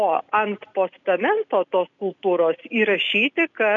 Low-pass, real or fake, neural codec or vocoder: 7.2 kHz; real; none